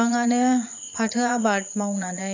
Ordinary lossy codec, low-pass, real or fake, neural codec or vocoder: AAC, 48 kbps; 7.2 kHz; fake; vocoder, 22.05 kHz, 80 mel bands, Vocos